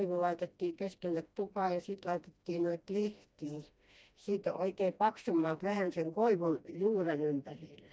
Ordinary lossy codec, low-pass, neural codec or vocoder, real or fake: none; none; codec, 16 kHz, 1 kbps, FreqCodec, smaller model; fake